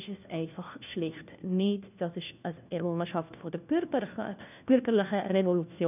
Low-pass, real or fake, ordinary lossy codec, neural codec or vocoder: 3.6 kHz; fake; none; codec, 16 kHz, 1 kbps, FunCodec, trained on LibriTTS, 50 frames a second